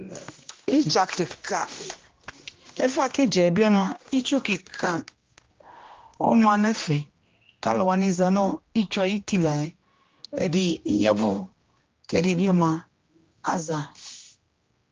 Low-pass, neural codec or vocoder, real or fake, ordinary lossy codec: 7.2 kHz; codec, 16 kHz, 1 kbps, X-Codec, HuBERT features, trained on general audio; fake; Opus, 24 kbps